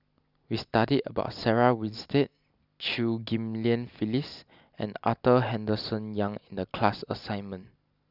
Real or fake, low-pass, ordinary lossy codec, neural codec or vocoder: real; 5.4 kHz; none; none